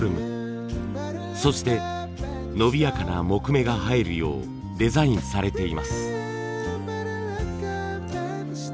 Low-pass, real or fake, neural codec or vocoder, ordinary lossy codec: none; real; none; none